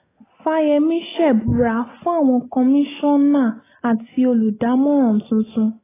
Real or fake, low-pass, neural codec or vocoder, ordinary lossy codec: real; 3.6 kHz; none; AAC, 16 kbps